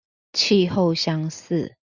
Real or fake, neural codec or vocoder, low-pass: real; none; 7.2 kHz